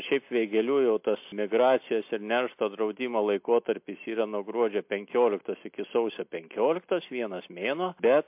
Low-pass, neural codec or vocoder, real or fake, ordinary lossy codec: 3.6 kHz; none; real; MP3, 32 kbps